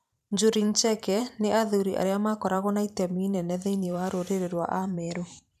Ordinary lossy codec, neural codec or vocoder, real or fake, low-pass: none; vocoder, 44.1 kHz, 128 mel bands every 512 samples, BigVGAN v2; fake; 14.4 kHz